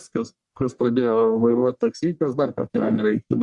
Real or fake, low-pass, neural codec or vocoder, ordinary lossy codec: fake; 10.8 kHz; codec, 44.1 kHz, 1.7 kbps, Pupu-Codec; Opus, 64 kbps